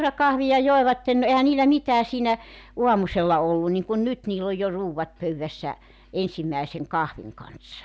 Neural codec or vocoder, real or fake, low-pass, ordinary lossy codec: none; real; none; none